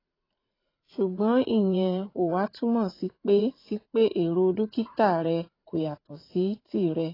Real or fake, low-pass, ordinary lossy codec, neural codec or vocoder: fake; 5.4 kHz; AAC, 24 kbps; vocoder, 44.1 kHz, 80 mel bands, Vocos